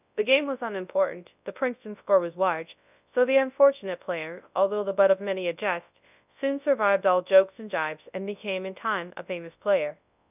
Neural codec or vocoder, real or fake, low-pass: codec, 24 kHz, 0.9 kbps, WavTokenizer, large speech release; fake; 3.6 kHz